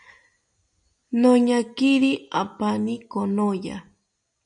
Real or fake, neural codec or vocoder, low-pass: real; none; 9.9 kHz